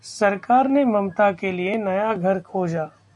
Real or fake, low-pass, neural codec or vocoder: real; 10.8 kHz; none